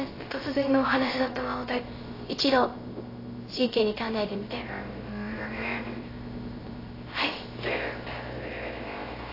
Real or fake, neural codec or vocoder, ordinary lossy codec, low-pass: fake; codec, 16 kHz, 0.3 kbps, FocalCodec; AAC, 24 kbps; 5.4 kHz